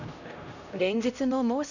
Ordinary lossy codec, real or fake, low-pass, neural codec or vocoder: none; fake; 7.2 kHz; codec, 16 kHz, 0.5 kbps, X-Codec, HuBERT features, trained on LibriSpeech